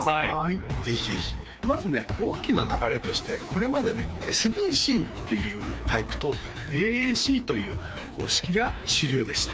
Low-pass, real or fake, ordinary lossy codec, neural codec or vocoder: none; fake; none; codec, 16 kHz, 2 kbps, FreqCodec, larger model